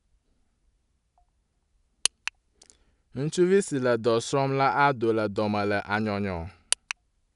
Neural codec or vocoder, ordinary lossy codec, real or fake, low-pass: none; none; real; 10.8 kHz